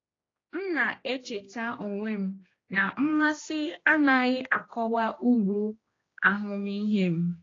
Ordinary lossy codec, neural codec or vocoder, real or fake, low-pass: AAC, 32 kbps; codec, 16 kHz, 1 kbps, X-Codec, HuBERT features, trained on general audio; fake; 7.2 kHz